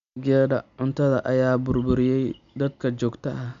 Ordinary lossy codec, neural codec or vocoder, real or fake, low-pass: none; none; real; 7.2 kHz